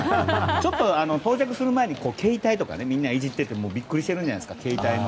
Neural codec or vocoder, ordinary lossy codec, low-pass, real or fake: none; none; none; real